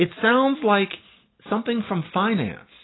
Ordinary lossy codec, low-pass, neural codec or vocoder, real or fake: AAC, 16 kbps; 7.2 kHz; none; real